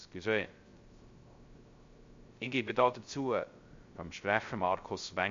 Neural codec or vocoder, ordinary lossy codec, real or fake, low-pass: codec, 16 kHz, 0.3 kbps, FocalCodec; MP3, 48 kbps; fake; 7.2 kHz